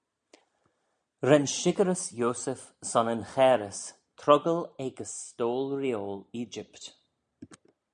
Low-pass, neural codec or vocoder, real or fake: 9.9 kHz; none; real